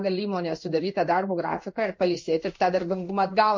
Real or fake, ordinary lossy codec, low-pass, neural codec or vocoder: fake; MP3, 48 kbps; 7.2 kHz; codec, 16 kHz in and 24 kHz out, 1 kbps, XY-Tokenizer